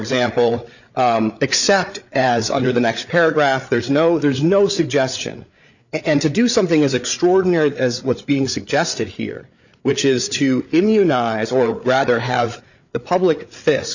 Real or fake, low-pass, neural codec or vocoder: fake; 7.2 kHz; codec, 16 kHz, 8 kbps, FreqCodec, larger model